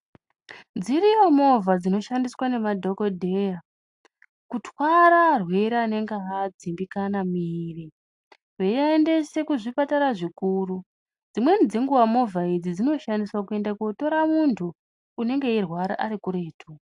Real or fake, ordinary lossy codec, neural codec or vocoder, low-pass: real; AAC, 64 kbps; none; 10.8 kHz